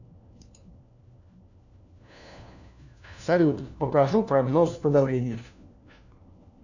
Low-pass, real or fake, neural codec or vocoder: 7.2 kHz; fake; codec, 16 kHz, 1 kbps, FunCodec, trained on LibriTTS, 50 frames a second